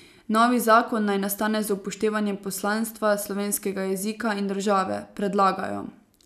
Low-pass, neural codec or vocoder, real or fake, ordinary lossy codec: 14.4 kHz; none; real; none